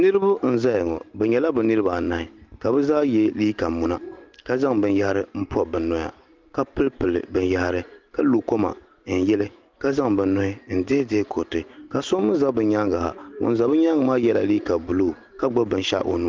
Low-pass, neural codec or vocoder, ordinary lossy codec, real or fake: 7.2 kHz; none; Opus, 24 kbps; real